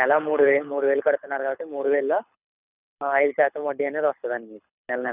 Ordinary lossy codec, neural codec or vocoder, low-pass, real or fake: none; codec, 24 kHz, 6 kbps, HILCodec; 3.6 kHz; fake